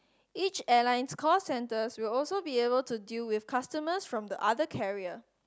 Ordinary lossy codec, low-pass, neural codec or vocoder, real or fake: none; none; none; real